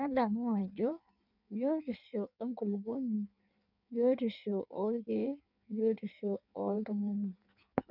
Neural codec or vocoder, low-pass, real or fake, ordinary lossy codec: codec, 16 kHz in and 24 kHz out, 1.1 kbps, FireRedTTS-2 codec; 5.4 kHz; fake; none